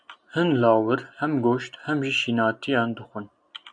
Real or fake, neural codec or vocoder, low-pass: real; none; 9.9 kHz